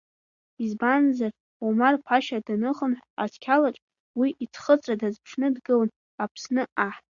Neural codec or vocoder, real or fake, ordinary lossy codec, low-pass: none; real; Opus, 64 kbps; 7.2 kHz